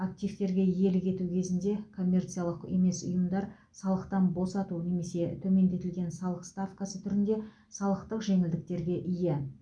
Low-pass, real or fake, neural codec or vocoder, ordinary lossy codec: 9.9 kHz; real; none; none